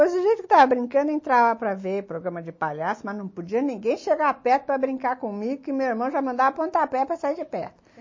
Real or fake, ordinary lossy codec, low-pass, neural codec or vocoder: real; MP3, 32 kbps; 7.2 kHz; none